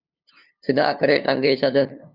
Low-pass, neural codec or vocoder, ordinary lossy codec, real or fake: 5.4 kHz; codec, 16 kHz, 2 kbps, FunCodec, trained on LibriTTS, 25 frames a second; Opus, 64 kbps; fake